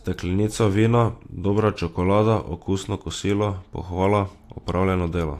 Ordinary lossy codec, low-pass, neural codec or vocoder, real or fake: AAC, 64 kbps; 14.4 kHz; none; real